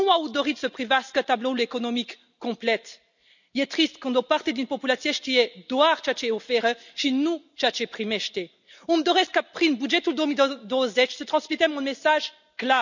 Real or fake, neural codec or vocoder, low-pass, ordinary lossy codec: real; none; 7.2 kHz; none